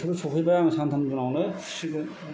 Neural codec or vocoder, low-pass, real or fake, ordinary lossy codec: none; none; real; none